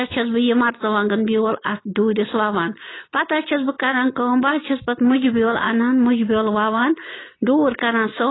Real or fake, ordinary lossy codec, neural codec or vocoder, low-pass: real; AAC, 16 kbps; none; 7.2 kHz